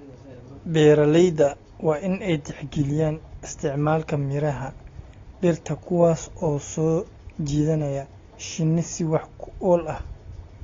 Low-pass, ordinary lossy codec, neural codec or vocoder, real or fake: 7.2 kHz; AAC, 32 kbps; none; real